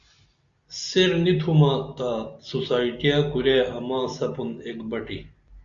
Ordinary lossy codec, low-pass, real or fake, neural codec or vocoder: Opus, 64 kbps; 7.2 kHz; real; none